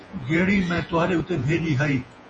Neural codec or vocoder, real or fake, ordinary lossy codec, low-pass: vocoder, 48 kHz, 128 mel bands, Vocos; fake; MP3, 32 kbps; 10.8 kHz